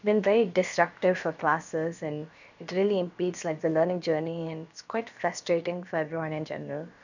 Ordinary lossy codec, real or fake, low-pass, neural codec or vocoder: none; fake; 7.2 kHz; codec, 16 kHz, about 1 kbps, DyCAST, with the encoder's durations